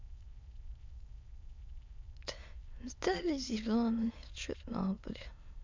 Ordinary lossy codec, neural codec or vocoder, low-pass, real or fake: MP3, 64 kbps; autoencoder, 22.05 kHz, a latent of 192 numbers a frame, VITS, trained on many speakers; 7.2 kHz; fake